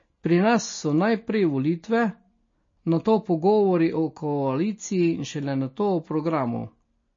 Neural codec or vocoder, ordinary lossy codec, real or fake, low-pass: none; MP3, 32 kbps; real; 7.2 kHz